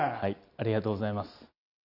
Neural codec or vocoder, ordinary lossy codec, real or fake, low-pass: none; none; real; 5.4 kHz